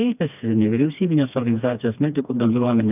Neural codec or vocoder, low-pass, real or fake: codec, 16 kHz, 2 kbps, FreqCodec, smaller model; 3.6 kHz; fake